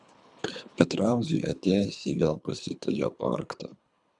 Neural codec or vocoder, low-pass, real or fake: codec, 24 kHz, 3 kbps, HILCodec; 10.8 kHz; fake